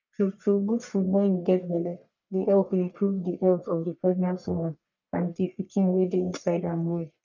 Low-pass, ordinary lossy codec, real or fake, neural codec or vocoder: 7.2 kHz; none; fake; codec, 44.1 kHz, 1.7 kbps, Pupu-Codec